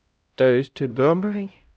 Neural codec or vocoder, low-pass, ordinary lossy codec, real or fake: codec, 16 kHz, 0.5 kbps, X-Codec, HuBERT features, trained on LibriSpeech; none; none; fake